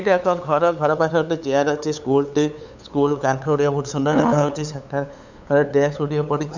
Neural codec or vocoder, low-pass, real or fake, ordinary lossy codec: codec, 16 kHz, 8 kbps, FunCodec, trained on LibriTTS, 25 frames a second; 7.2 kHz; fake; none